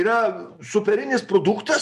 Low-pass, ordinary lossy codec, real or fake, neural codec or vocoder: 14.4 kHz; MP3, 64 kbps; real; none